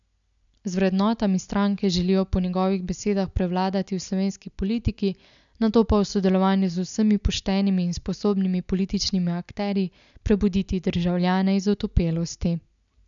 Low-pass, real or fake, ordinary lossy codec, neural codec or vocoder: 7.2 kHz; real; none; none